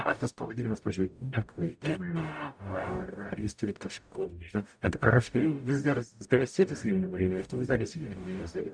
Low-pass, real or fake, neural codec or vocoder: 9.9 kHz; fake; codec, 44.1 kHz, 0.9 kbps, DAC